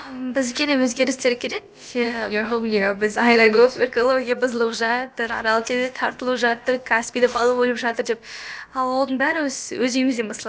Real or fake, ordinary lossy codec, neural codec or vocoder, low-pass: fake; none; codec, 16 kHz, about 1 kbps, DyCAST, with the encoder's durations; none